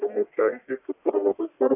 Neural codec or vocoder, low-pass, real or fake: codec, 44.1 kHz, 1.7 kbps, Pupu-Codec; 3.6 kHz; fake